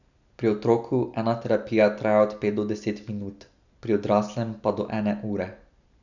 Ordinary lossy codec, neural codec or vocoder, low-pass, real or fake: none; none; 7.2 kHz; real